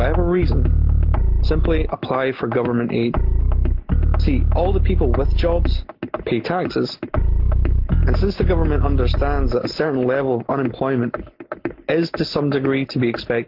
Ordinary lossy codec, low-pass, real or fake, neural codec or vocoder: Opus, 32 kbps; 5.4 kHz; real; none